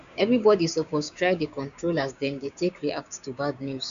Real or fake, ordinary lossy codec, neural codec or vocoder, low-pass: fake; none; codec, 16 kHz, 6 kbps, DAC; 7.2 kHz